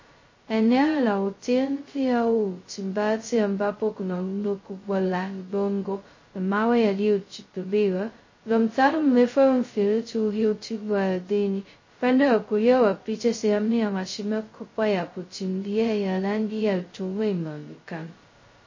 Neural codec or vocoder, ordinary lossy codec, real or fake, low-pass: codec, 16 kHz, 0.2 kbps, FocalCodec; MP3, 32 kbps; fake; 7.2 kHz